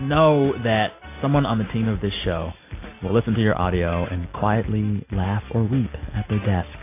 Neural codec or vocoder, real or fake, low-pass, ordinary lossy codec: none; real; 3.6 kHz; AAC, 24 kbps